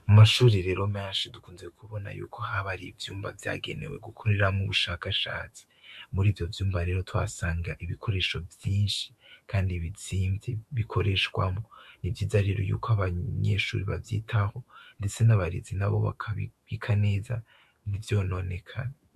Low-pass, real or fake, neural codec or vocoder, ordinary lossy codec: 14.4 kHz; fake; autoencoder, 48 kHz, 128 numbers a frame, DAC-VAE, trained on Japanese speech; MP3, 64 kbps